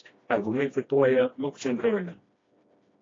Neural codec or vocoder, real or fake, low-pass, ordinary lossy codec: codec, 16 kHz, 1 kbps, FreqCodec, smaller model; fake; 7.2 kHz; AAC, 32 kbps